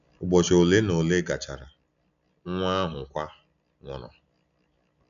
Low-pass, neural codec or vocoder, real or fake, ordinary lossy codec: 7.2 kHz; none; real; none